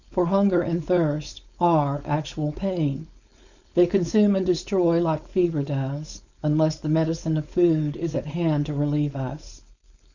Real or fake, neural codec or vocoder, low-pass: fake; codec, 16 kHz, 4.8 kbps, FACodec; 7.2 kHz